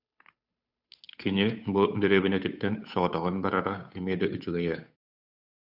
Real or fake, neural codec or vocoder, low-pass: fake; codec, 16 kHz, 8 kbps, FunCodec, trained on Chinese and English, 25 frames a second; 5.4 kHz